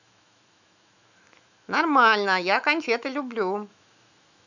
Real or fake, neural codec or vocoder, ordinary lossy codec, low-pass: fake; autoencoder, 48 kHz, 128 numbers a frame, DAC-VAE, trained on Japanese speech; none; 7.2 kHz